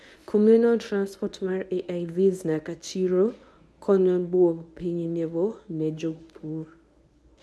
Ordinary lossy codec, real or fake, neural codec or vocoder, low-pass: none; fake; codec, 24 kHz, 0.9 kbps, WavTokenizer, medium speech release version 1; none